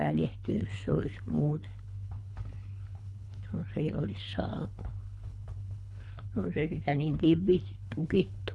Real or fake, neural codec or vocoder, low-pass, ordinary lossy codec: fake; codec, 24 kHz, 3 kbps, HILCodec; none; none